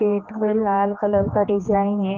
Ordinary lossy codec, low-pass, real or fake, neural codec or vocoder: Opus, 24 kbps; 7.2 kHz; fake; codec, 16 kHz, 2 kbps, X-Codec, HuBERT features, trained on general audio